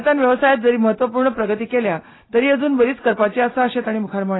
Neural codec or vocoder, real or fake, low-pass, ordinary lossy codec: none; real; 7.2 kHz; AAC, 16 kbps